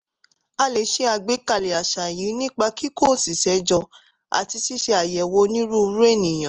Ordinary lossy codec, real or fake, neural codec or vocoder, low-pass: Opus, 24 kbps; real; none; 7.2 kHz